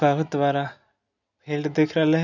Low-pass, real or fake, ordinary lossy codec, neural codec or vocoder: 7.2 kHz; real; none; none